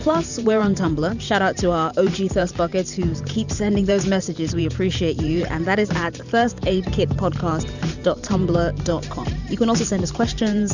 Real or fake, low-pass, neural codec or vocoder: fake; 7.2 kHz; vocoder, 44.1 kHz, 128 mel bands every 512 samples, BigVGAN v2